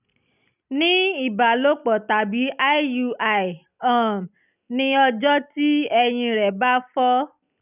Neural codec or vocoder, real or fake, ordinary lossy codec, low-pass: none; real; none; 3.6 kHz